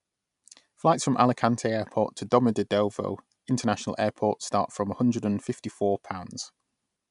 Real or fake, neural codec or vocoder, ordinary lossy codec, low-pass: real; none; none; 10.8 kHz